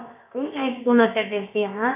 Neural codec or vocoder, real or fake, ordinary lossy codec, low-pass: codec, 16 kHz, about 1 kbps, DyCAST, with the encoder's durations; fake; none; 3.6 kHz